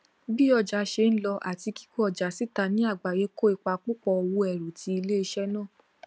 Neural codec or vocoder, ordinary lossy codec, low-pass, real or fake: none; none; none; real